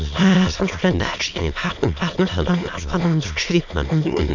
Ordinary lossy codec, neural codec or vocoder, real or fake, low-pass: none; autoencoder, 22.05 kHz, a latent of 192 numbers a frame, VITS, trained on many speakers; fake; 7.2 kHz